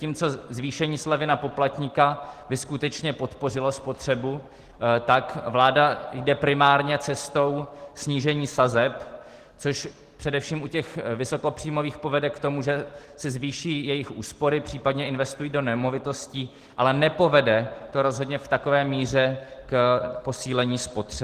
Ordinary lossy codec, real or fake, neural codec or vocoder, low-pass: Opus, 16 kbps; real; none; 14.4 kHz